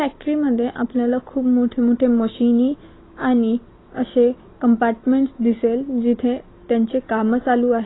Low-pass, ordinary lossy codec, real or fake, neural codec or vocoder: 7.2 kHz; AAC, 16 kbps; real; none